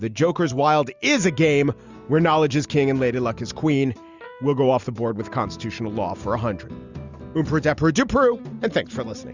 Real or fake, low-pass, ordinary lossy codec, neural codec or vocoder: real; 7.2 kHz; Opus, 64 kbps; none